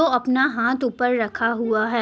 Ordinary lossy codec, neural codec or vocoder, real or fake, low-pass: none; none; real; none